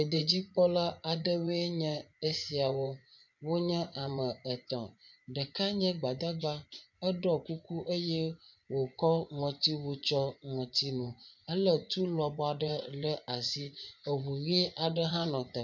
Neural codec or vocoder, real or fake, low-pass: vocoder, 24 kHz, 100 mel bands, Vocos; fake; 7.2 kHz